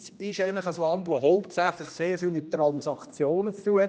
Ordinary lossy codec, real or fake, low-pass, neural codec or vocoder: none; fake; none; codec, 16 kHz, 1 kbps, X-Codec, HuBERT features, trained on general audio